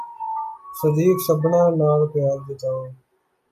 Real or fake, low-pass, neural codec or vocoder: real; 14.4 kHz; none